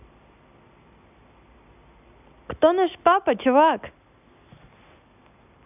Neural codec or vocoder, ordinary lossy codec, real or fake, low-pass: none; none; real; 3.6 kHz